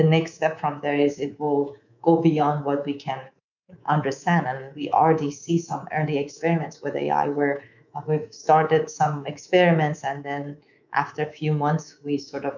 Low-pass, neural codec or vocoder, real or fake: 7.2 kHz; codec, 24 kHz, 3.1 kbps, DualCodec; fake